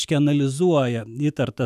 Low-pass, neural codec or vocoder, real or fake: 14.4 kHz; autoencoder, 48 kHz, 128 numbers a frame, DAC-VAE, trained on Japanese speech; fake